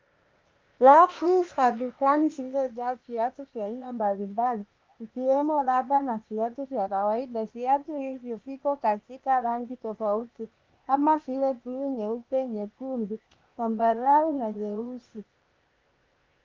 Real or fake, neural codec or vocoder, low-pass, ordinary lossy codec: fake; codec, 16 kHz, 0.8 kbps, ZipCodec; 7.2 kHz; Opus, 24 kbps